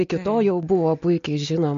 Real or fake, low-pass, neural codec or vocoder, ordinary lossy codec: real; 7.2 kHz; none; MP3, 48 kbps